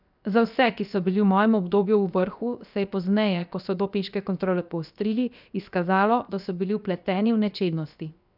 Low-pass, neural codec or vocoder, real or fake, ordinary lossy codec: 5.4 kHz; codec, 16 kHz, 0.7 kbps, FocalCodec; fake; none